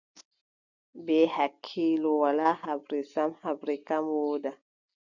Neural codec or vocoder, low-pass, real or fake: none; 7.2 kHz; real